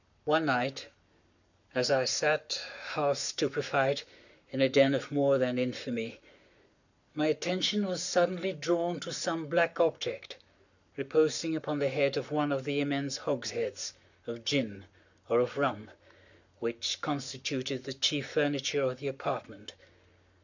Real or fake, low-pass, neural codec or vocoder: fake; 7.2 kHz; codec, 44.1 kHz, 7.8 kbps, Pupu-Codec